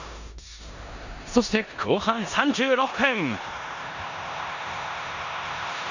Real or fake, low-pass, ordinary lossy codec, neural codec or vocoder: fake; 7.2 kHz; none; codec, 16 kHz in and 24 kHz out, 0.9 kbps, LongCat-Audio-Codec, four codebook decoder